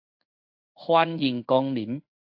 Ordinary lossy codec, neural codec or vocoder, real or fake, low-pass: AAC, 48 kbps; codec, 16 kHz in and 24 kHz out, 1 kbps, XY-Tokenizer; fake; 5.4 kHz